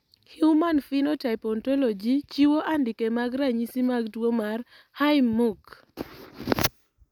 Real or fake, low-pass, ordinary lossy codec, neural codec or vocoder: real; 19.8 kHz; none; none